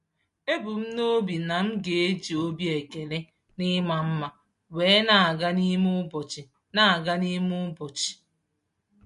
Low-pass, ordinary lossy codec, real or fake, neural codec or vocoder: 10.8 kHz; MP3, 48 kbps; real; none